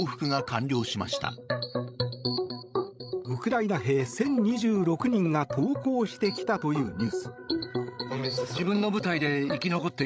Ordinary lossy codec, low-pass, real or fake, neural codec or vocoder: none; none; fake; codec, 16 kHz, 16 kbps, FreqCodec, larger model